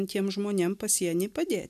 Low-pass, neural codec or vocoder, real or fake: 14.4 kHz; none; real